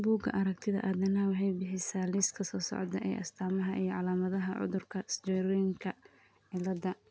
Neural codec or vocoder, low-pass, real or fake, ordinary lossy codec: none; none; real; none